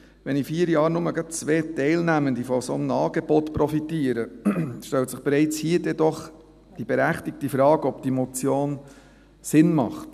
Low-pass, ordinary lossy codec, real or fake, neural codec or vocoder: 14.4 kHz; none; real; none